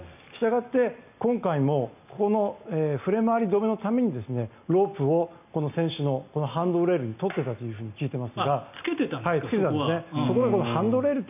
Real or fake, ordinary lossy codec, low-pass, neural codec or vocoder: real; none; 3.6 kHz; none